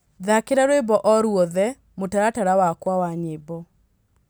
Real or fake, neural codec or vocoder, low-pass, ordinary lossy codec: real; none; none; none